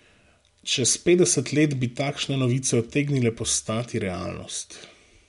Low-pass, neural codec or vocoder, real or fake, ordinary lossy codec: 10.8 kHz; none; real; MP3, 64 kbps